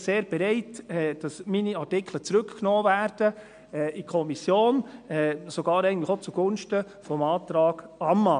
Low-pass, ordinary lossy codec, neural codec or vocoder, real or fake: 9.9 kHz; MP3, 64 kbps; none; real